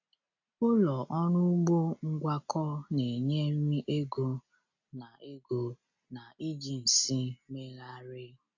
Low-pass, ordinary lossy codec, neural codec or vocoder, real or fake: 7.2 kHz; AAC, 48 kbps; none; real